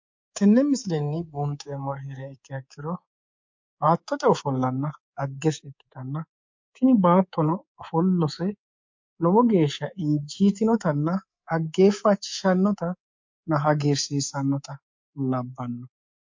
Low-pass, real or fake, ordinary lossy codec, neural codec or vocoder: 7.2 kHz; fake; MP3, 48 kbps; codec, 24 kHz, 3.1 kbps, DualCodec